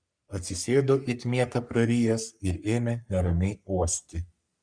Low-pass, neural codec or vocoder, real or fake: 9.9 kHz; codec, 44.1 kHz, 3.4 kbps, Pupu-Codec; fake